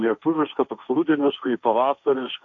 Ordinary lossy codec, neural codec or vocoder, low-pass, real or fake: AAC, 48 kbps; codec, 16 kHz, 1.1 kbps, Voila-Tokenizer; 7.2 kHz; fake